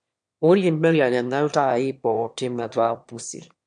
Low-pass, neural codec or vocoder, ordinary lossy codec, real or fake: 9.9 kHz; autoencoder, 22.05 kHz, a latent of 192 numbers a frame, VITS, trained on one speaker; MP3, 64 kbps; fake